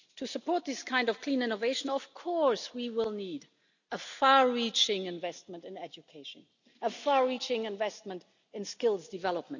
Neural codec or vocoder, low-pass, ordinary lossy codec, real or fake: none; 7.2 kHz; none; real